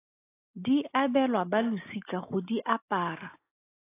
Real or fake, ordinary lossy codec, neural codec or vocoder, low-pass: real; AAC, 24 kbps; none; 3.6 kHz